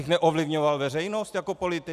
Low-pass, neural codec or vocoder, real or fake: 14.4 kHz; none; real